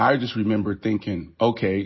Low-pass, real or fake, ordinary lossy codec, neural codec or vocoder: 7.2 kHz; real; MP3, 24 kbps; none